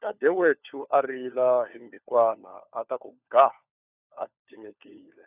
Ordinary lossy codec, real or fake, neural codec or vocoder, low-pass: none; fake; codec, 16 kHz, 4 kbps, FunCodec, trained on LibriTTS, 50 frames a second; 3.6 kHz